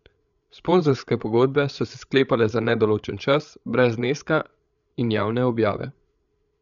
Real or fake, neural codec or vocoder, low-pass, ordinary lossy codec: fake; codec, 16 kHz, 8 kbps, FreqCodec, larger model; 7.2 kHz; none